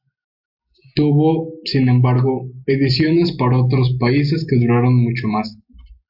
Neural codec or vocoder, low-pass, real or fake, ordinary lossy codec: none; 5.4 kHz; real; AAC, 48 kbps